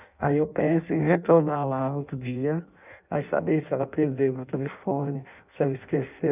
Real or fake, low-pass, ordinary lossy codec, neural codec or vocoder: fake; 3.6 kHz; none; codec, 16 kHz in and 24 kHz out, 0.6 kbps, FireRedTTS-2 codec